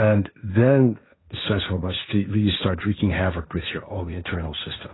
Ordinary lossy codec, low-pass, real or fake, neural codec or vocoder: AAC, 16 kbps; 7.2 kHz; fake; codec, 16 kHz, 1.1 kbps, Voila-Tokenizer